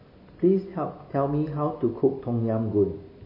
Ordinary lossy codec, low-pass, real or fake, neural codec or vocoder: MP3, 24 kbps; 5.4 kHz; real; none